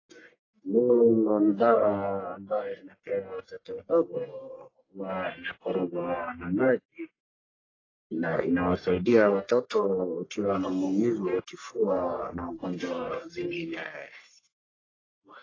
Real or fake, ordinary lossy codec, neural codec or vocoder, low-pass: fake; AAC, 32 kbps; codec, 44.1 kHz, 1.7 kbps, Pupu-Codec; 7.2 kHz